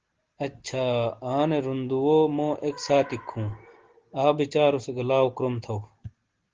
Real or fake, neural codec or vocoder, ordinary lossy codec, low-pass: real; none; Opus, 16 kbps; 7.2 kHz